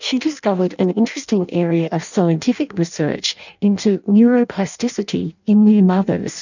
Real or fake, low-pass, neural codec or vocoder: fake; 7.2 kHz; codec, 16 kHz in and 24 kHz out, 0.6 kbps, FireRedTTS-2 codec